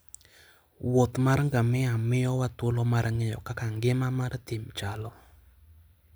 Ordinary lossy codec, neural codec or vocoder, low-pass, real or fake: none; none; none; real